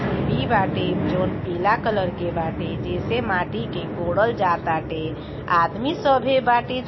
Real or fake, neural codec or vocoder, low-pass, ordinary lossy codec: real; none; 7.2 kHz; MP3, 24 kbps